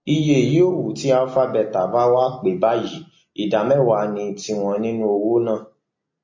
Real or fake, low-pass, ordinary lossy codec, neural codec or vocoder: real; 7.2 kHz; MP3, 32 kbps; none